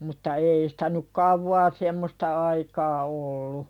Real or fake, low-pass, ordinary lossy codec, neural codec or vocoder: real; 19.8 kHz; none; none